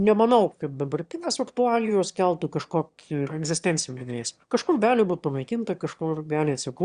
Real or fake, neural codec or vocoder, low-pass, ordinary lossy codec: fake; autoencoder, 22.05 kHz, a latent of 192 numbers a frame, VITS, trained on one speaker; 9.9 kHz; Opus, 64 kbps